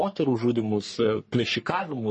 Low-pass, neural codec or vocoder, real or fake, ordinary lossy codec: 10.8 kHz; codec, 44.1 kHz, 3.4 kbps, Pupu-Codec; fake; MP3, 32 kbps